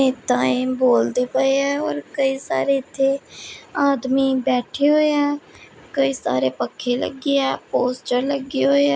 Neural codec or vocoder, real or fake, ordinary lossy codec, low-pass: none; real; none; none